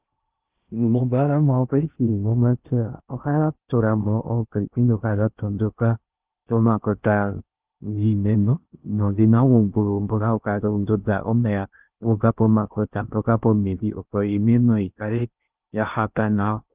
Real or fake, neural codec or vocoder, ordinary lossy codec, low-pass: fake; codec, 16 kHz in and 24 kHz out, 0.6 kbps, FocalCodec, streaming, 2048 codes; Opus, 32 kbps; 3.6 kHz